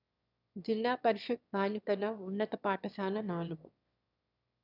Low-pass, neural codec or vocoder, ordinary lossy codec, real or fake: 5.4 kHz; autoencoder, 22.05 kHz, a latent of 192 numbers a frame, VITS, trained on one speaker; none; fake